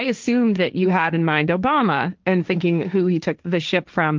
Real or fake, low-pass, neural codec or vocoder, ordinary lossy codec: fake; 7.2 kHz; codec, 16 kHz, 1.1 kbps, Voila-Tokenizer; Opus, 24 kbps